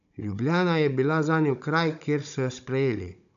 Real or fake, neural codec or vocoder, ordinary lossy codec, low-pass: fake; codec, 16 kHz, 16 kbps, FunCodec, trained on Chinese and English, 50 frames a second; none; 7.2 kHz